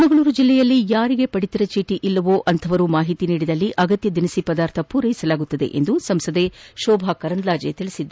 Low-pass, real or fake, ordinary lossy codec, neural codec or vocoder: none; real; none; none